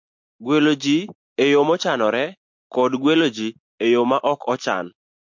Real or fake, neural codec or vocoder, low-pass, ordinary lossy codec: real; none; 7.2 kHz; MP3, 48 kbps